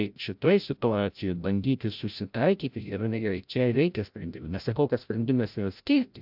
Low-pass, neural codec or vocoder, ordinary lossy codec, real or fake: 5.4 kHz; codec, 16 kHz, 0.5 kbps, FreqCodec, larger model; AAC, 48 kbps; fake